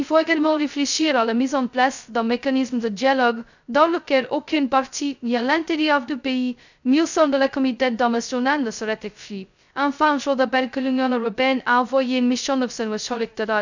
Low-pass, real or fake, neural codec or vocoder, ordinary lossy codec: 7.2 kHz; fake; codec, 16 kHz, 0.2 kbps, FocalCodec; none